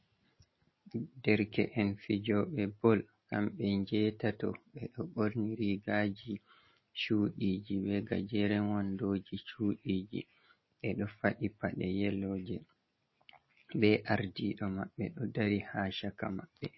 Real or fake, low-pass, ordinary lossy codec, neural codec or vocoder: real; 7.2 kHz; MP3, 24 kbps; none